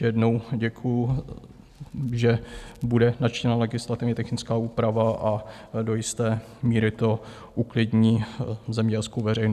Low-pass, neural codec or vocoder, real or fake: 14.4 kHz; none; real